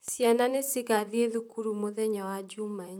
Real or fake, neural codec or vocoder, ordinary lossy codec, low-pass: fake; vocoder, 44.1 kHz, 128 mel bands, Pupu-Vocoder; none; none